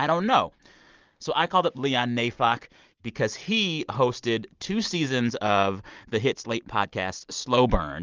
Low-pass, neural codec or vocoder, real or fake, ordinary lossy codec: 7.2 kHz; none; real; Opus, 32 kbps